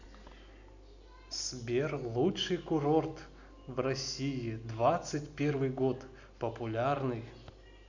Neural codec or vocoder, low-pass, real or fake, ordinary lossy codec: none; 7.2 kHz; real; none